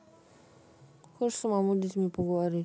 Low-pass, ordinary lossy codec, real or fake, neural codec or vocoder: none; none; real; none